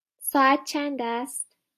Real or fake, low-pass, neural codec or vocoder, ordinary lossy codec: real; 14.4 kHz; none; AAC, 64 kbps